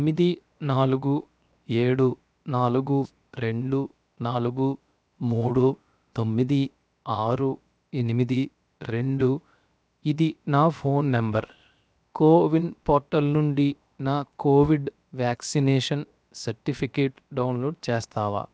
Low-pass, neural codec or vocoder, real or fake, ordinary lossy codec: none; codec, 16 kHz, 0.7 kbps, FocalCodec; fake; none